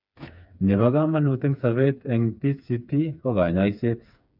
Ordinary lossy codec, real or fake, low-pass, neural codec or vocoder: none; fake; 5.4 kHz; codec, 16 kHz, 4 kbps, FreqCodec, smaller model